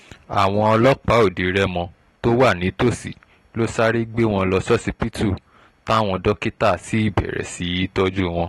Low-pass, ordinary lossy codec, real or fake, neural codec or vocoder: 19.8 kHz; AAC, 32 kbps; real; none